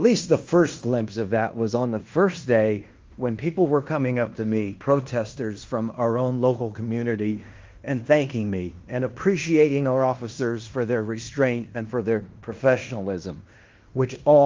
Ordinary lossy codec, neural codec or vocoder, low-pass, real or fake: Opus, 32 kbps; codec, 16 kHz in and 24 kHz out, 0.9 kbps, LongCat-Audio-Codec, fine tuned four codebook decoder; 7.2 kHz; fake